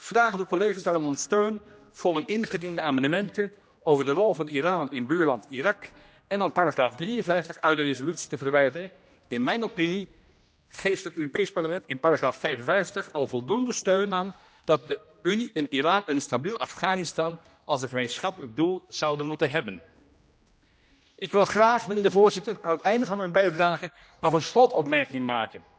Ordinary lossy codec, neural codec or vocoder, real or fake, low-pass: none; codec, 16 kHz, 1 kbps, X-Codec, HuBERT features, trained on general audio; fake; none